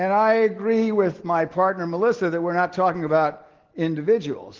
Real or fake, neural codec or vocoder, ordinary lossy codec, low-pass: real; none; Opus, 16 kbps; 7.2 kHz